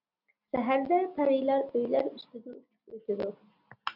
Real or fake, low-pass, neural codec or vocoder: real; 5.4 kHz; none